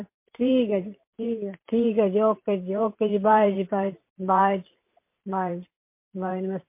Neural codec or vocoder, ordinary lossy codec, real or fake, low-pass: vocoder, 44.1 kHz, 128 mel bands every 512 samples, BigVGAN v2; MP3, 24 kbps; fake; 3.6 kHz